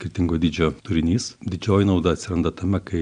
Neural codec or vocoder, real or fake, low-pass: none; real; 9.9 kHz